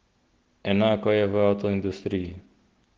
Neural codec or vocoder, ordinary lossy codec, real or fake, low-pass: none; Opus, 16 kbps; real; 7.2 kHz